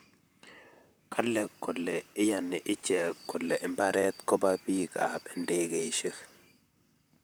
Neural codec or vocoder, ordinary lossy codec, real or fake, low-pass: vocoder, 44.1 kHz, 128 mel bands, Pupu-Vocoder; none; fake; none